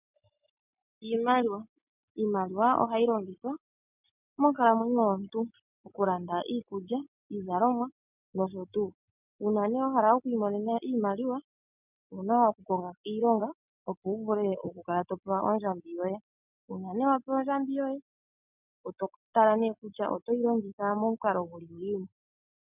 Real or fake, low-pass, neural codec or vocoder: real; 3.6 kHz; none